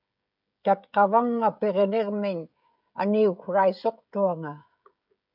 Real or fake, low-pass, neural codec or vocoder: fake; 5.4 kHz; codec, 16 kHz, 16 kbps, FreqCodec, smaller model